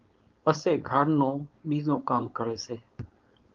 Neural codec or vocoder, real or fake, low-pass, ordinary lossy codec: codec, 16 kHz, 4.8 kbps, FACodec; fake; 7.2 kHz; Opus, 32 kbps